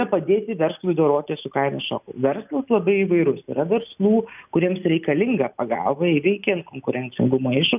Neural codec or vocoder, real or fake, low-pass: none; real; 3.6 kHz